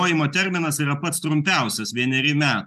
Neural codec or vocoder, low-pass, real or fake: none; 14.4 kHz; real